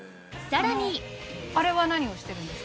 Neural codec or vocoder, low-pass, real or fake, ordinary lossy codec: none; none; real; none